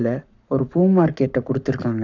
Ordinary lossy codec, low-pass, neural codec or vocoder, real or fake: none; 7.2 kHz; codec, 44.1 kHz, 7.8 kbps, Pupu-Codec; fake